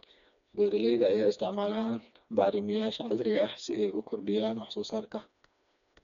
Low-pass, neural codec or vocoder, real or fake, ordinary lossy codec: 7.2 kHz; codec, 16 kHz, 2 kbps, FreqCodec, smaller model; fake; none